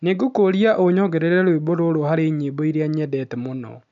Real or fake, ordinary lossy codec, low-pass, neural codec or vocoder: real; none; 7.2 kHz; none